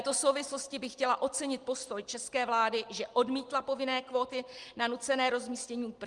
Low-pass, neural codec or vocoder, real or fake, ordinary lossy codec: 10.8 kHz; none; real; Opus, 24 kbps